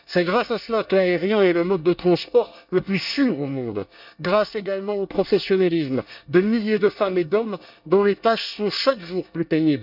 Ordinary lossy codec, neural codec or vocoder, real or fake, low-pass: none; codec, 24 kHz, 1 kbps, SNAC; fake; 5.4 kHz